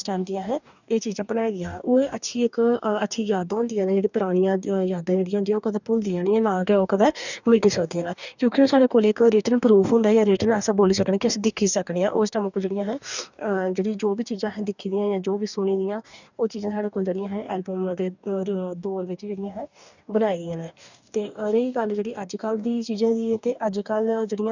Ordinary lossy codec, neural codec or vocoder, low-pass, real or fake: none; codec, 44.1 kHz, 2.6 kbps, DAC; 7.2 kHz; fake